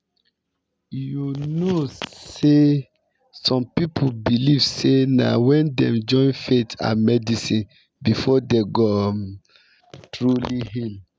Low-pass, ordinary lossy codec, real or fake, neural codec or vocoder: none; none; real; none